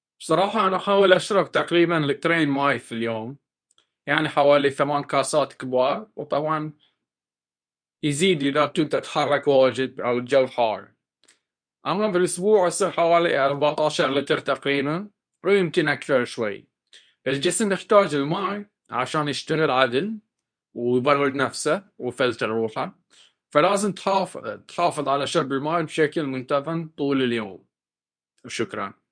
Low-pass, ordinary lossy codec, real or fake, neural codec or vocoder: 9.9 kHz; none; fake; codec, 24 kHz, 0.9 kbps, WavTokenizer, medium speech release version 2